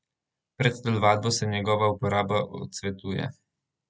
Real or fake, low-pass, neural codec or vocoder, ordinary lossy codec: real; none; none; none